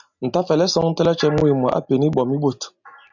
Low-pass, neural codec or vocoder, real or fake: 7.2 kHz; none; real